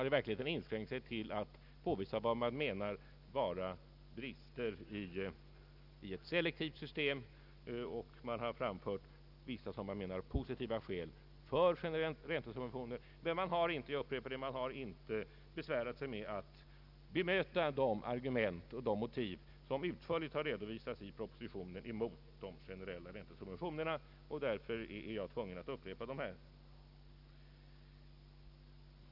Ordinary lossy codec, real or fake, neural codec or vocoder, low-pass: none; real; none; 5.4 kHz